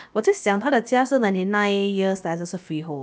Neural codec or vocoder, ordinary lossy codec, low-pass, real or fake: codec, 16 kHz, about 1 kbps, DyCAST, with the encoder's durations; none; none; fake